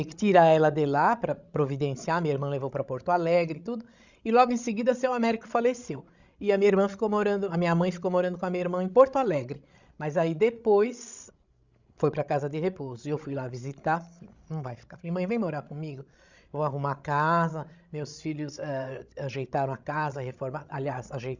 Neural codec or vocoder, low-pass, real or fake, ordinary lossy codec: codec, 16 kHz, 16 kbps, FreqCodec, larger model; 7.2 kHz; fake; Opus, 64 kbps